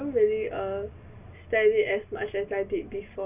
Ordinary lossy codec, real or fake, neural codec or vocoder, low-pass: none; real; none; 3.6 kHz